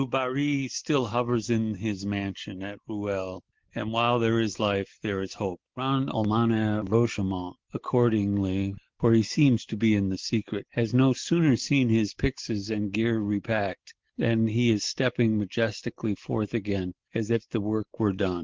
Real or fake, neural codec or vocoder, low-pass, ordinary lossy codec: real; none; 7.2 kHz; Opus, 16 kbps